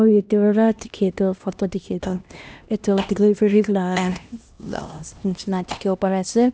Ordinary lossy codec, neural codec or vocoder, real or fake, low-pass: none; codec, 16 kHz, 1 kbps, X-Codec, HuBERT features, trained on LibriSpeech; fake; none